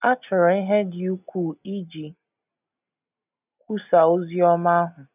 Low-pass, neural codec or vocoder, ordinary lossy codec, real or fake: 3.6 kHz; none; none; real